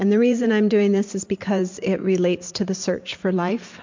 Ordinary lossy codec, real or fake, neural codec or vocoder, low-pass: MP3, 48 kbps; fake; vocoder, 44.1 kHz, 128 mel bands every 512 samples, BigVGAN v2; 7.2 kHz